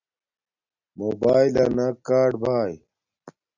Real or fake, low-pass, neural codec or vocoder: real; 7.2 kHz; none